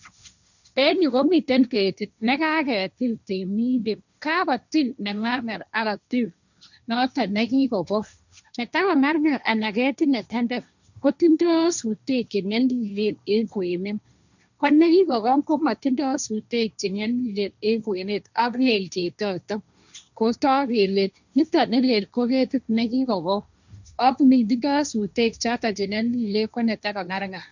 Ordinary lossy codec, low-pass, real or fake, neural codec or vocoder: none; 7.2 kHz; fake; codec, 16 kHz, 1.1 kbps, Voila-Tokenizer